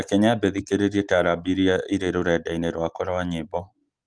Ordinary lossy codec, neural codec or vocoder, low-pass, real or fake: Opus, 24 kbps; none; 9.9 kHz; real